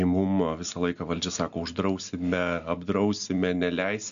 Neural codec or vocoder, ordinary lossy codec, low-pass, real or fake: none; MP3, 96 kbps; 7.2 kHz; real